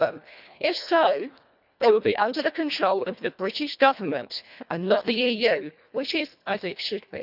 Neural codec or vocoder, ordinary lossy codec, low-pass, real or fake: codec, 24 kHz, 1.5 kbps, HILCodec; none; 5.4 kHz; fake